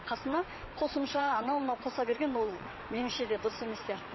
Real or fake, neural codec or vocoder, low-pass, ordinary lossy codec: fake; vocoder, 22.05 kHz, 80 mel bands, WaveNeXt; 7.2 kHz; MP3, 24 kbps